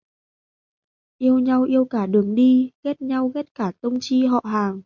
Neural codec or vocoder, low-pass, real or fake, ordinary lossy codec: none; 7.2 kHz; real; MP3, 64 kbps